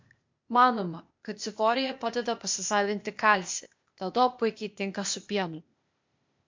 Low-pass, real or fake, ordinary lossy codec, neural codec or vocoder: 7.2 kHz; fake; MP3, 48 kbps; codec, 16 kHz, 0.8 kbps, ZipCodec